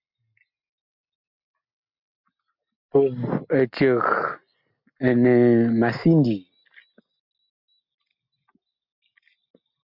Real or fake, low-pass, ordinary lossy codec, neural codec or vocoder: real; 5.4 kHz; MP3, 48 kbps; none